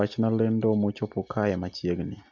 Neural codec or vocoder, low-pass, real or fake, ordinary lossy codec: none; 7.2 kHz; real; none